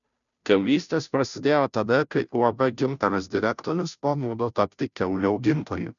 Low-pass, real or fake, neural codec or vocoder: 7.2 kHz; fake; codec, 16 kHz, 0.5 kbps, FunCodec, trained on Chinese and English, 25 frames a second